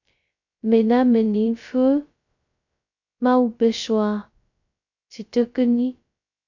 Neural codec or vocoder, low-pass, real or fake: codec, 16 kHz, 0.2 kbps, FocalCodec; 7.2 kHz; fake